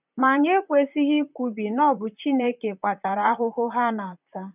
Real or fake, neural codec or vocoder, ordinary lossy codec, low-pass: fake; vocoder, 44.1 kHz, 128 mel bands, Pupu-Vocoder; none; 3.6 kHz